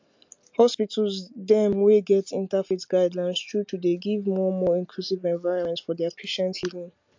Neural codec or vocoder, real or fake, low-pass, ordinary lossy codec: none; real; 7.2 kHz; MP3, 48 kbps